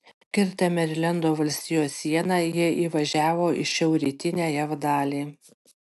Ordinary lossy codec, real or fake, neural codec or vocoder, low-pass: AAC, 96 kbps; real; none; 14.4 kHz